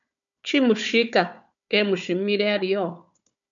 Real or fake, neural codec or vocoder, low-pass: fake; codec, 16 kHz, 4 kbps, FunCodec, trained on Chinese and English, 50 frames a second; 7.2 kHz